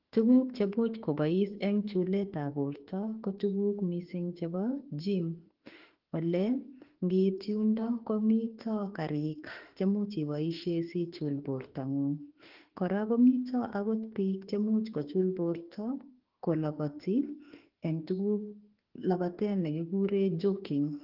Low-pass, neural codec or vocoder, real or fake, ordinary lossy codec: 5.4 kHz; autoencoder, 48 kHz, 32 numbers a frame, DAC-VAE, trained on Japanese speech; fake; Opus, 16 kbps